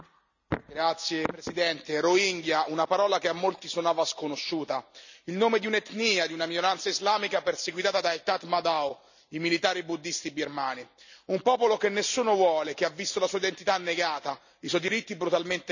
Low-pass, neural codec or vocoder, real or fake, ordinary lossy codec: 7.2 kHz; none; real; none